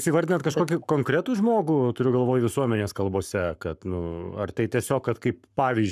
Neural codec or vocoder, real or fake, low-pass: codec, 44.1 kHz, 7.8 kbps, Pupu-Codec; fake; 14.4 kHz